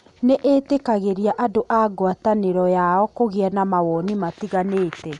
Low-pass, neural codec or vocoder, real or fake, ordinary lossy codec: 10.8 kHz; none; real; none